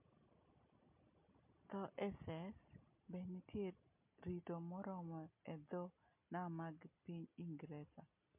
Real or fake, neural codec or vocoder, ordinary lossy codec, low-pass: real; none; MP3, 32 kbps; 3.6 kHz